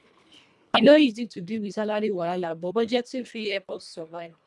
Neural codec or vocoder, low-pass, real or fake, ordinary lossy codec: codec, 24 kHz, 1.5 kbps, HILCodec; none; fake; none